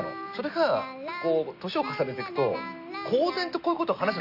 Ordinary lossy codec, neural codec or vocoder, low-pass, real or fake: none; none; 5.4 kHz; real